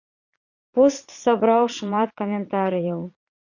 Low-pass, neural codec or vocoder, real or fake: 7.2 kHz; vocoder, 22.05 kHz, 80 mel bands, Vocos; fake